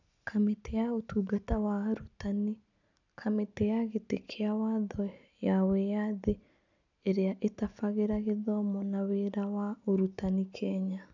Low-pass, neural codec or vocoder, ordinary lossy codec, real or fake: 7.2 kHz; none; none; real